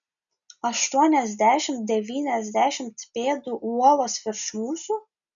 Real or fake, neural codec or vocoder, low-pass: real; none; 7.2 kHz